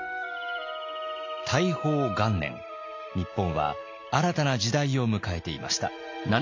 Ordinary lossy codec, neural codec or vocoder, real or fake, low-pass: MP3, 48 kbps; none; real; 7.2 kHz